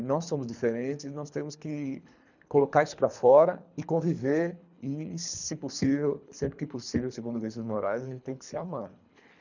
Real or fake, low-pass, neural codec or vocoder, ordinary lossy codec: fake; 7.2 kHz; codec, 24 kHz, 3 kbps, HILCodec; none